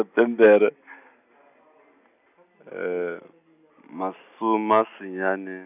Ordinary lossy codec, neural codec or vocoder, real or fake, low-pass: none; none; real; 3.6 kHz